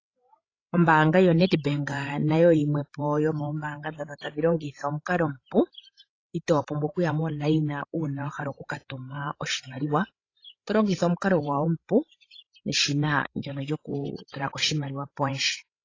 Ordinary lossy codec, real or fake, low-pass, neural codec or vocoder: AAC, 32 kbps; fake; 7.2 kHz; codec, 16 kHz, 16 kbps, FreqCodec, larger model